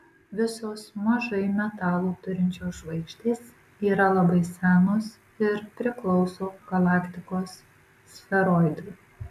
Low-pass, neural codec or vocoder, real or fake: 14.4 kHz; none; real